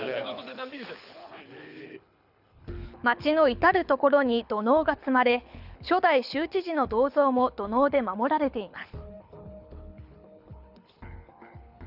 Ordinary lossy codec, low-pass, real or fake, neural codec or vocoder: none; 5.4 kHz; fake; codec, 24 kHz, 6 kbps, HILCodec